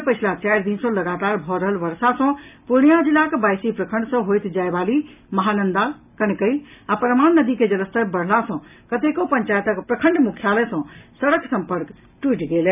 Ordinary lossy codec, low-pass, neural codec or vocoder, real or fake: none; 3.6 kHz; none; real